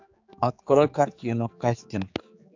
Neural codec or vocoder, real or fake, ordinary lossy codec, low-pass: codec, 16 kHz, 4 kbps, X-Codec, HuBERT features, trained on general audio; fake; AAC, 48 kbps; 7.2 kHz